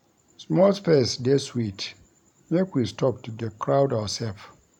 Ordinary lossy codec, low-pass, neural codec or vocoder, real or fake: none; 19.8 kHz; vocoder, 44.1 kHz, 128 mel bands every 512 samples, BigVGAN v2; fake